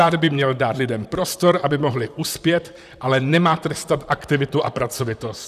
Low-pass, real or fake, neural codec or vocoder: 14.4 kHz; fake; vocoder, 44.1 kHz, 128 mel bands, Pupu-Vocoder